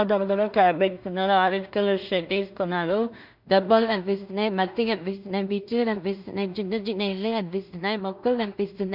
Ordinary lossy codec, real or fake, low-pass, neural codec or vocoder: none; fake; 5.4 kHz; codec, 16 kHz in and 24 kHz out, 0.4 kbps, LongCat-Audio-Codec, two codebook decoder